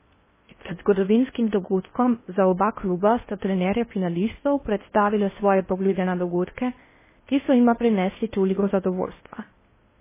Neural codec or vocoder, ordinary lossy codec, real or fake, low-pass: codec, 16 kHz in and 24 kHz out, 0.6 kbps, FocalCodec, streaming, 4096 codes; MP3, 16 kbps; fake; 3.6 kHz